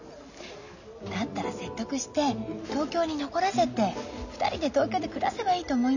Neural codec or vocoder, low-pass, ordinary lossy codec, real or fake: none; 7.2 kHz; none; real